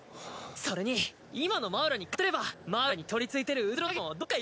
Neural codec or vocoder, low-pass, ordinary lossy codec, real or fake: none; none; none; real